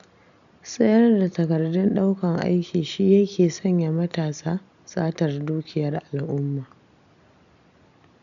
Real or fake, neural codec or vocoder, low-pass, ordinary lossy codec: real; none; 7.2 kHz; none